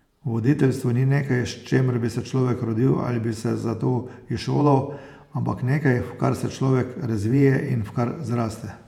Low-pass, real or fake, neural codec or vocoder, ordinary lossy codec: 19.8 kHz; fake; vocoder, 48 kHz, 128 mel bands, Vocos; none